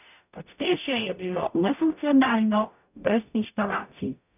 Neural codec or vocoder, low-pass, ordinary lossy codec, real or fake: codec, 44.1 kHz, 0.9 kbps, DAC; 3.6 kHz; none; fake